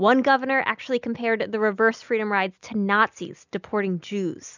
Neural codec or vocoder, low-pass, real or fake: none; 7.2 kHz; real